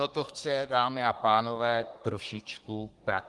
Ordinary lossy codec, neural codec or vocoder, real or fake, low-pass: Opus, 24 kbps; codec, 24 kHz, 1 kbps, SNAC; fake; 10.8 kHz